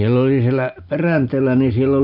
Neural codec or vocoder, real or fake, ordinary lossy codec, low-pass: none; real; none; 5.4 kHz